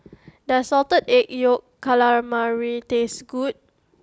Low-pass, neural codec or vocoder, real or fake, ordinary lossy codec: none; none; real; none